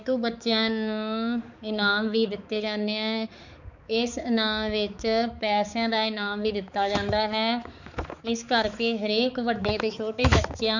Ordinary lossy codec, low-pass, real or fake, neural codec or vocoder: none; 7.2 kHz; fake; codec, 16 kHz, 4 kbps, X-Codec, HuBERT features, trained on balanced general audio